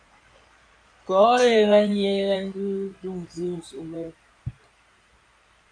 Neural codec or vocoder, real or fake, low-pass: codec, 16 kHz in and 24 kHz out, 2.2 kbps, FireRedTTS-2 codec; fake; 9.9 kHz